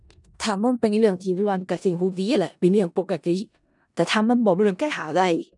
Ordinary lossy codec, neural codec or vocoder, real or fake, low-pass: none; codec, 16 kHz in and 24 kHz out, 0.4 kbps, LongCat-Audio-Codec, four codebook decoder; fake; 10.8 kHz